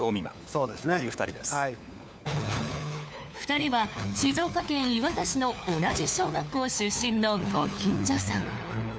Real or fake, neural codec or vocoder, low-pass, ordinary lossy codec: fake; codec, 16 kHz, 4 kbps, FunCodec, trained on LibriTTS, 50 frames a second; none; none